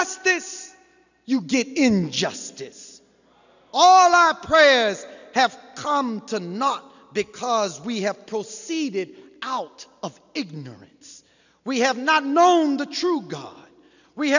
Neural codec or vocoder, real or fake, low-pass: none; real; 7.2 kHz